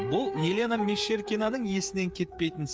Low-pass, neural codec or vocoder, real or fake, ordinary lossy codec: none; codec, 16 kHz, 8 kbps, FreqCodec, smaller model; fake; none